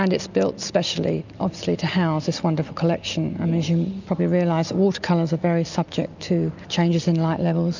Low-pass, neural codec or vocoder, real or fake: 7.2 kHz; none; real